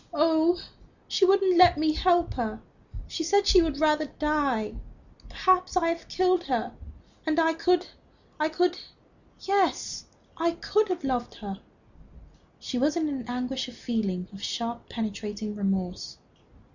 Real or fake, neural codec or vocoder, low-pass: real; none; 7.2 kHz